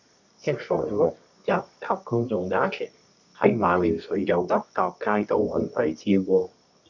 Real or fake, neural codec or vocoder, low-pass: fake; codec, 24 kHz, 0.9 kbps, WavTokenizer, medium music audio release; 7.2 kHz